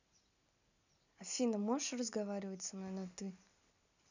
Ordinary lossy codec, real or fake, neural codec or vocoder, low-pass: none; real; none; 7.2 kHz